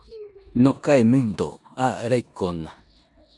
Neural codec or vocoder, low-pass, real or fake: codec, 16 kHz in and 24 kHz out, 0.9 kbps, LongCat-Audio-Codec, four codebook decoder; 10.8 kHz; fake